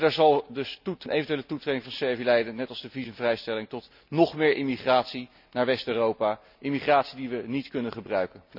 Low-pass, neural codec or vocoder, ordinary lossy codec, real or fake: 5.4 kHz; none; none; real